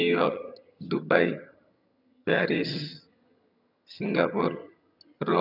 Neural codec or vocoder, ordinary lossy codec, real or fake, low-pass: vocoder, 22.05 kHz, 80 mel bands, HiFi-GAN; none; fake; 5.4 kHz